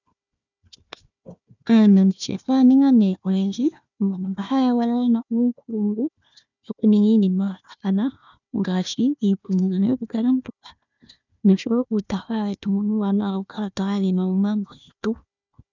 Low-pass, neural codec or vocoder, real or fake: 7.2 kHz; codec, 16 kHz, 1 kbps, FunCodec, trained on Chinese and English, 50 frames a second; fake